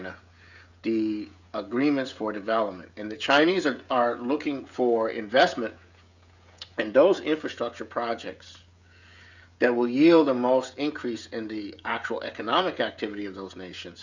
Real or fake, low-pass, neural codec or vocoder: fake; 7.2 kHz; codec, 16 kHz, 16 kbps, FreqCodec, smaller model